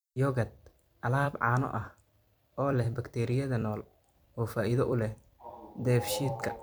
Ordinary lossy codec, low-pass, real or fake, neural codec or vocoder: none; none; fake; vocoder, 44.1 kHz, 128 mel bands every 256 samples, BigVGAN v2